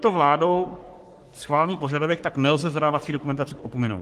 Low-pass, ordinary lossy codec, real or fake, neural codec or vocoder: 14.4 kHz; Opus, 24 kbps; fake; codec, 44.1 kHz, 3.4 kbps, Pupu-Codec